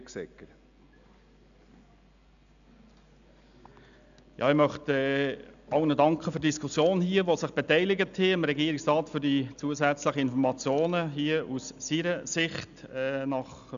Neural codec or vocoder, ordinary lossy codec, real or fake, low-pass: none; none; real; 7.2 kHz